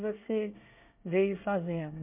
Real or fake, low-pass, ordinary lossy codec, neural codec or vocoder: fake; 3.6 kHz; MP3, 32 kbps; codec, 24 kHz, 1 kbps, SNAC